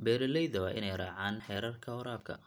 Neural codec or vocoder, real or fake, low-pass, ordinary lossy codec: none; real; none; none